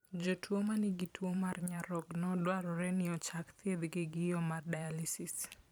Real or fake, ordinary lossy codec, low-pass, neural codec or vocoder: fake; none; none; vocoder, 44.1 kHz, 128 mel bands every 512 samples, BigVGAN v2